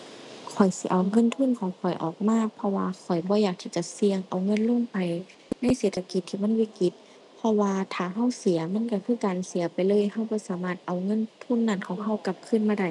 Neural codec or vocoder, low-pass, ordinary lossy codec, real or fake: none; 10.8 kHz; none; real